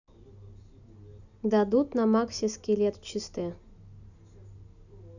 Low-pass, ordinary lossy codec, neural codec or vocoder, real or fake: 7.2 kHz; none; none; real